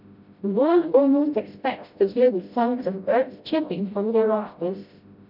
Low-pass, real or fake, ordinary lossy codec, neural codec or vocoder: 5.4 kHz; fake; none; codec, 16 kHz, 0.5 kbps, FreqCodec, smaller model